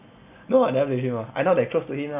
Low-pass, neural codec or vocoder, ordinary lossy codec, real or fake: 3.6 kHz; none; none; real